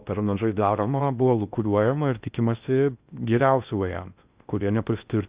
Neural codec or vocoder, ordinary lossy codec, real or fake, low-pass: codec, 16 kHz in and 24 kHz out, 0.6 kbps, FocalCodec, streaming, 4096 codes; Opus, 64 kbps; fake; 3.6 kHz